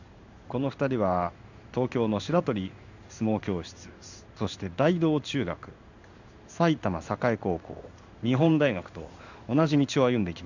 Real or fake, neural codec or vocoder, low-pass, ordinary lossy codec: fake; codec, 16 kHz in and 24 kHz out, 1 kbps, XY-Tokenizer; 7.2 kHz; none